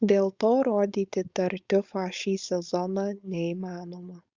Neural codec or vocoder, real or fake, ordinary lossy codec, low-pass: codec, 16 kHz, 4.8 kbps, FACodec; fake; Opus, 64 kbps; 7.2 kHz